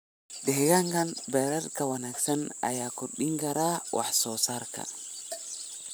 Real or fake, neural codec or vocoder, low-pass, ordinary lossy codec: fake; vocoder, 44.1 kHz, 128 mel bands every 512 samples, BigVGAN v2; none; none